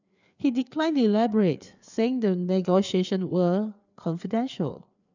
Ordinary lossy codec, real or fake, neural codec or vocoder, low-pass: none; fake; codec, 16 kHz, 4 kbps, FreqCodec, larger model; 7.2 kHz